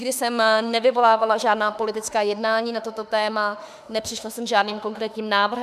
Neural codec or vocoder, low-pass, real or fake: autoencoder, 48 kHz, 32 numbers a frame, DAC-VAE, trained on Japanese speech; 14.4 kHz; fake